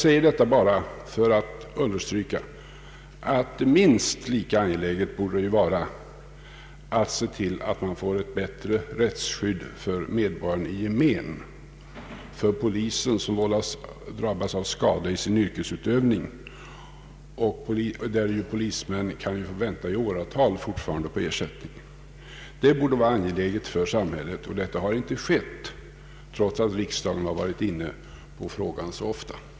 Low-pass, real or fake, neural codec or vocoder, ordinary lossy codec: none; real; none; none